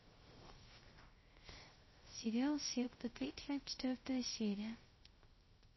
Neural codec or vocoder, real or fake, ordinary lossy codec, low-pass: codec, 16 kHz, 0.3 kbps, FocalCodec; fake; MP3, 24 kbps; 7.2 kHz